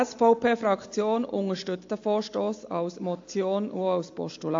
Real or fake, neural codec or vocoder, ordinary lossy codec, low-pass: real; none; AAC, 48 kbps; 7.2 kHz